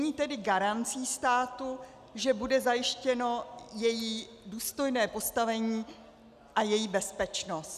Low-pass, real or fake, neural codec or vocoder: 14.4 kHz; real; none